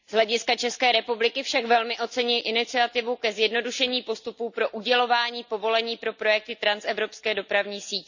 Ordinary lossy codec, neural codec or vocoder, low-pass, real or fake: none; none; 7.2 kHz; real